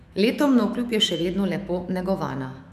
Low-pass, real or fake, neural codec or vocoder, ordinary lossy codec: 14.4 kHz; fake; autoencoder, 48 kHz, 128 numbers a frame, DAC-VAE, trained on Japanese speech; none